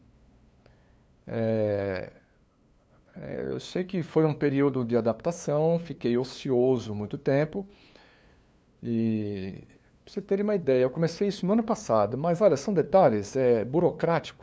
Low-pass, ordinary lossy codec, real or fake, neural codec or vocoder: none; none; fake; codec, 16 kHz, 2 kbps, FunCodec, trained on LibriTTS, 25 frames a second